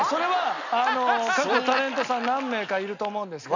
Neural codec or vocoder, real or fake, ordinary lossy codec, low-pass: none; real; none; 7.2 kHz